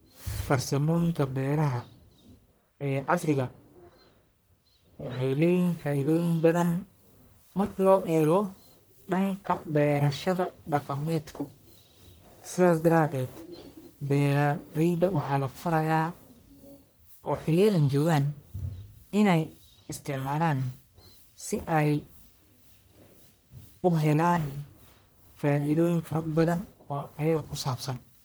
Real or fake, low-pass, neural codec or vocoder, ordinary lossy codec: fake; none; codec, 44.1 kHz, 1.7 kbps, Pupu-Codec; none